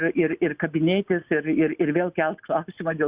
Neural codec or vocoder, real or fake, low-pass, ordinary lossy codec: none; real; 3.6 kHz; Opus, 64 kbps